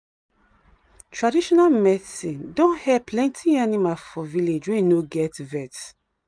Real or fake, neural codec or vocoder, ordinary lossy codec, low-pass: real; none; none; 9.9 kHz